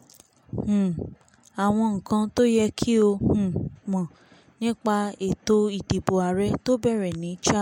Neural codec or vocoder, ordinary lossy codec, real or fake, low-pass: none; MP3, 64 kbps; real; 19.8 kHz